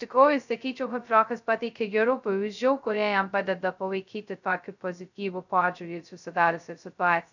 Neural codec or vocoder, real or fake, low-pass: codec, 16 kHz, 0.2 kbps, FocalCodec; fake; 7.2 kHz